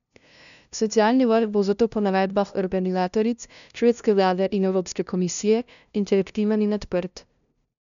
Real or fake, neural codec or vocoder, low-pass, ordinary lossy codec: fake; codec, 16 kHz, 0.5 kbps, FunCodec, trained on LibriTTS, 25 frames a second; 7.2 kHz; none